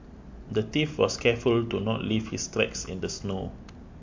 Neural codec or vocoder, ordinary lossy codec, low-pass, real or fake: none; MP3, 48 kbps; 7.2 kHz; real